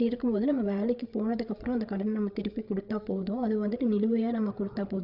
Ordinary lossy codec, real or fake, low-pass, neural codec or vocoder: none; fake; 5.4 kHz; codec, 16 kHz, 8 kbps, FreqCodec, larger model